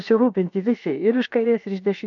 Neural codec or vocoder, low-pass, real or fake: codec, 16 kHz, about 1 kbps, DyCAST, with the encoder's durations; 7.2 kHz; fake